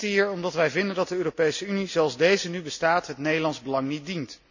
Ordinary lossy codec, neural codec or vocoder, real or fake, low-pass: none; none; real; 7.2 kHz